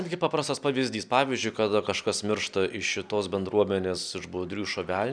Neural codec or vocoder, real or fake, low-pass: none; real; 9.9 kHz